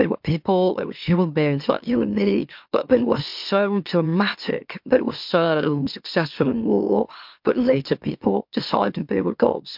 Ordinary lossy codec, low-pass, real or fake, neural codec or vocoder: MP3, 48 kbps; 5.4 kHz; fake; autoencoder, 44.1 kHz, a latent of 192 numbers a frame, MeloTTS